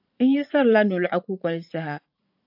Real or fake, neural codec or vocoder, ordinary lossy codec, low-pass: fake; vocoder, 44.1 kHz, 80 mel bands, Vocos; AAC, 48 kbps; 5.4 kHz